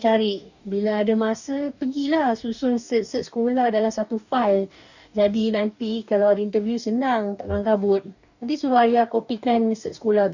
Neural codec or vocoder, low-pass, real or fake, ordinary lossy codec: codec, 44.1 kHz, 2.6 kbps, DAC; 7.2 kHz; fake; none